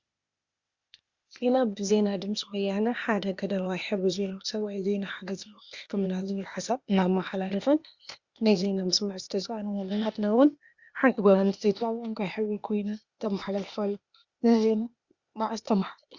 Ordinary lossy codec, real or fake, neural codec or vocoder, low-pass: Opus, 64 kbps; fake; codec, 16 kHz, 0.8 kbps, ZipCodec; 7.2 kHz